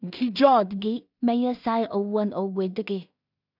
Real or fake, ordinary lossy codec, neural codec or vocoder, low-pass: fake; none; codec, 16 kHz in and 24 kHz out, 0.4 kbps, LongCat-Audio-Codec, two codebook decoder; 5.4 kHz